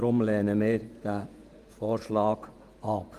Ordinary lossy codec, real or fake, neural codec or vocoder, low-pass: Opus, 32 kbps; fake; vocoder, 48 kHz, 128 mel bands, Vocos; 14.4 kHz